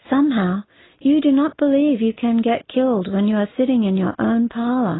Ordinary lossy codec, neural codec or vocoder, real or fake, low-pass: AAC, 16 kbps; none; real; 7.2 kHz